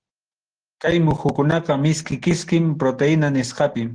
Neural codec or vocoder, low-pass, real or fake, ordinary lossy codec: none; 9.9 kHz; real; Opus, 16 kbps